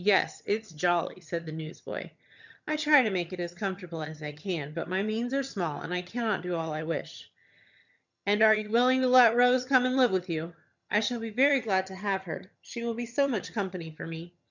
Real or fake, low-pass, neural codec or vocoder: fake; 7.2 kHz; vocoder, 22.05 kHz, 80 mel bands, HiFi-GAN